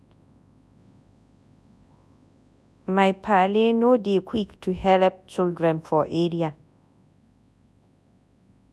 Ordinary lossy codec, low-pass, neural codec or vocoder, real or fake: none; none; codec, 24 kHz, 0.9 kbps, WavTokenizer, large speech release; fake